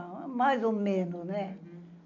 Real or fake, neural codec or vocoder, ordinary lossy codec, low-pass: real; none; none; 7.2 kHz